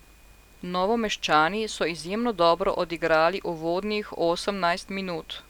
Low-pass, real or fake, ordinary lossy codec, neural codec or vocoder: 19.8 kHz; real; none; none